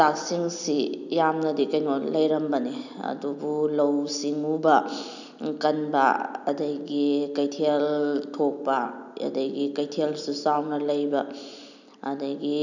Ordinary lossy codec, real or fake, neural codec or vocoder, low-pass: none; real; none; 7.2 kHz